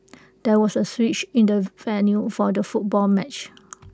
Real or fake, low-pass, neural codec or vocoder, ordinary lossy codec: real; none; none; none